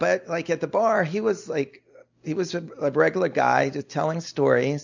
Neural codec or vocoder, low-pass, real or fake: none; 7.2 kHz; real